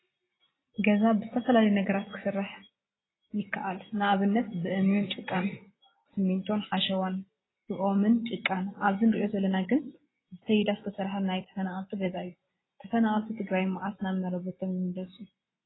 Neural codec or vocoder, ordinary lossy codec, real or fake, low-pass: none; AAC, 16 kbps; real; 7.2 kHz